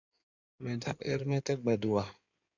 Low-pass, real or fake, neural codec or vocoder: 7.2 kHz; fake; codec, 16 kHz in and 24 kHz out, 2.2 kbps, FireRedTTS-2 codec